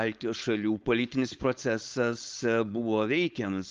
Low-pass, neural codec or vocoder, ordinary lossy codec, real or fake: 7.2 kHz; codec, 16 kHz, 4.8 kbps, FACodec; Opus, 32 kbps; fake